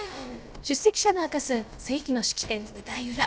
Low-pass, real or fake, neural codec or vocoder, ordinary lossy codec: none; fake; codec, 16 kHz, about 1 kbps, DyCAST, with the encoder's durations; none